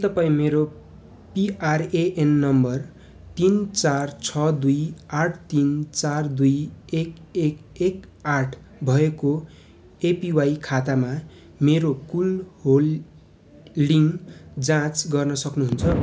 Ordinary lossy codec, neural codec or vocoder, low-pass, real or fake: none; none; none; real